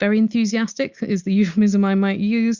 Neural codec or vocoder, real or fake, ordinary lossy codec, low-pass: none; real; Opus, 64 kbps; 7.2 kHz